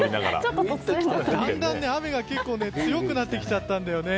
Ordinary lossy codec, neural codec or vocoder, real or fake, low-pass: none; none; real; none